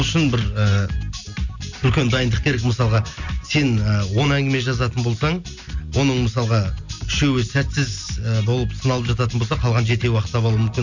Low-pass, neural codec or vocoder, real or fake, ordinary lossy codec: 7.2 kHz; none; real; none